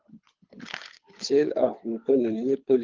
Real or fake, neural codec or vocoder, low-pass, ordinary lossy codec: fake; codec, 24 kHz, 3 kbps, HILCodec; 7.2 kHz; Opus, 32 kbps